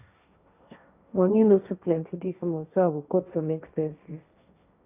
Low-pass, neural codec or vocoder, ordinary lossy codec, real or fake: 3.6 kHz; codec, 16 kHz, 1.1 kbps, Voila-Tokenizer; none; fake